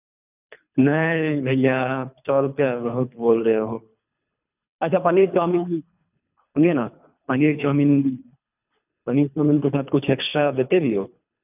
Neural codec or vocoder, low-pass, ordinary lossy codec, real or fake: codec, 24 kHz, 3 kbps, HILCodec; 3.6 kHz; none; fake